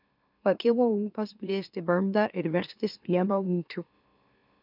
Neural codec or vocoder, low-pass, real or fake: autoencoder, 44.1 kHz, a latent of 192 numbers a frame, MeloTTS; 5.4 kHz; fake